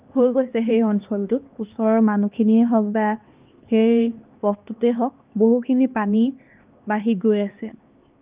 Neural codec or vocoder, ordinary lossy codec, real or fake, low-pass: codec, 16 kHz, 2 kbps, X-Codec, HuBERT features, trained on LibriSpeech; Opus, 24 kbps; fake; 3.6 kHz